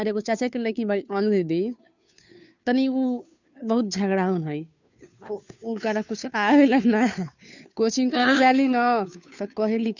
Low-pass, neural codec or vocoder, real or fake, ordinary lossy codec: 7.2 kHz; codec, 16 kHz, 2 kbps, FunCodec, trained on Chinese and English, 25 frames a second; fake; none